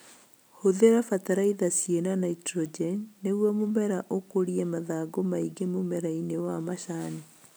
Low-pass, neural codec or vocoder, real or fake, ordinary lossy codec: none; none; real; none